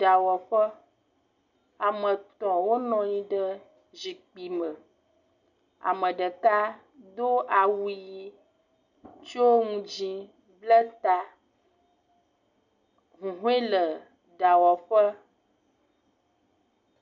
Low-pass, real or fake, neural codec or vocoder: 7.2 kHz; real; none